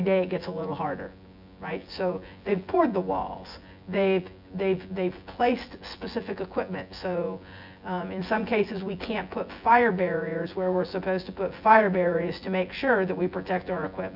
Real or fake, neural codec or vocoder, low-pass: fake; vocoder, 24 kHz, 100 mel bands, Vocos; 5.4 kHz